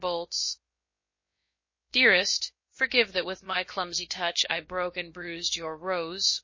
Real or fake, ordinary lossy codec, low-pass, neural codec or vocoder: fake; MP3, 32 kbps; 7.2 kHz; codec, 16 kHz, about 1 kbps, DyCAST, with the encoder's durations